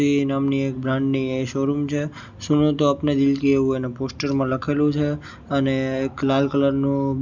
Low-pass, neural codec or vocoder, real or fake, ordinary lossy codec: 7.2 kHz; none; real; none